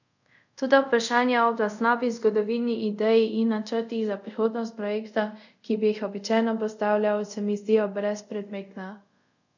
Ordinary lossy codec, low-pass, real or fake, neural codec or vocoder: none; 7.2 kHz; fake; codec, 24 kHz, 0.5 kbps, DualCodec